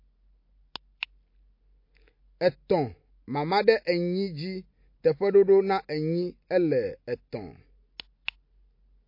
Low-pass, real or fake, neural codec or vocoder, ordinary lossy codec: 5.4 kHz; real; none; MP3, 32 kbps